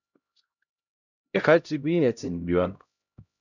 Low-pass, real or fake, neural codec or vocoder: 7.2 kHz; fake; codec, 16 kHz, 0.5 kbps, X-Codec, HuBERT features, trained on LibriSpeech